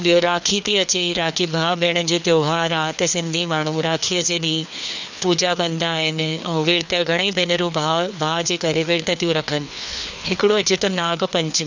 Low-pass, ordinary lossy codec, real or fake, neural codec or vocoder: 7.2 kHz; none; fake; codec, 16 kHz, 2 kbps, FreqCodec, larger model